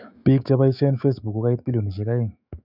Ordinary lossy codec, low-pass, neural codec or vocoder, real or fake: none; 5.4 kHz; autoencoder, 48 kHz, 128 numbers a frame, DAC-VAE, trained on Japanese speech; fake